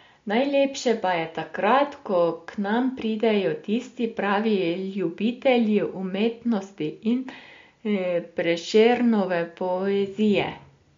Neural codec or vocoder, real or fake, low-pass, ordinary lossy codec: none; real; 7.2 kHz; MP3, 48 kbps